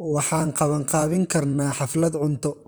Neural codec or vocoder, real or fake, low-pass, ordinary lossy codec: vocoder, 44.1 kHz, 128 mel bands, Pupu-Vocoder; fake; none; none